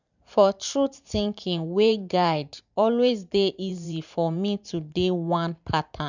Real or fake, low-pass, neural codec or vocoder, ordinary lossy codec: fake; 7.2 kHz; vocoder, 44.1 kHz, 128 mel bands every 512 samples, BigVGAN v2; none